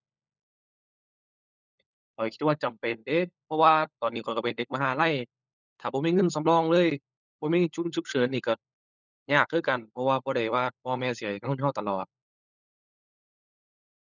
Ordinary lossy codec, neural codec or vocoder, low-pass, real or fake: none; codec, 16 kHz, 16 kbps, FunCodec, trained on LibriTTS, 50 frames a second; 7.2 kHz; fake